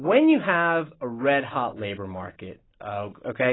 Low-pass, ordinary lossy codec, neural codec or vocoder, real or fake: 7.2 kHz; AAC, 16 kbps; none; real